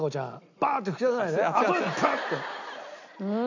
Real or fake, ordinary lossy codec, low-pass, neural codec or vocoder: real; none; 7.2 kHz; none